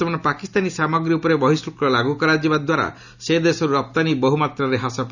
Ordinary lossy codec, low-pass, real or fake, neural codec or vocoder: none; 7.2 kHz; real; none